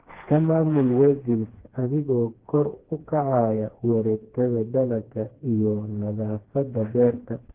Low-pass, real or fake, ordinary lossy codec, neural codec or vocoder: 3.6 kHz; fake; Opus, 16 kbps; codec, 16 kHz, 2 kbps, FreqCodec, smaller model